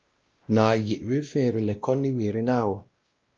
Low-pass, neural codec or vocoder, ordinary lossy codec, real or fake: 7.2 kHz; codec, 16 kHz, 1 kbps, X-Codec, WavLM features, trained on Multilingual LibriSpeech; Opus, 24 kbps; fake